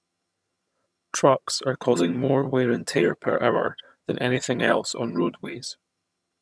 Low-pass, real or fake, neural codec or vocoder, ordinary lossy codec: none; fake; vocoder, 22.05 kHz, 80 mel bands, HiFi-GAN; none